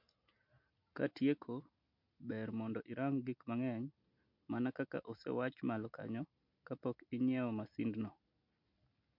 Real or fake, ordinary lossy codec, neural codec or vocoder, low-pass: real; MP3, 48 kbps; none; 5.4 kHz